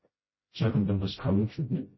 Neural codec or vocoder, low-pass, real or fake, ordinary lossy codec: codec, 16 kHz, 0.5 kbps, FreqCodec, smaller model; 7.2 kHz; fake; MP3, 24 kbps